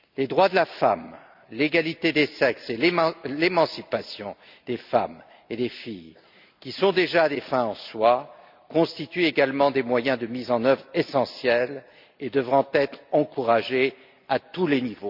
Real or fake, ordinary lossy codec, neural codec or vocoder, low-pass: real; MP3, 48 kbps; none; 5.4 kHz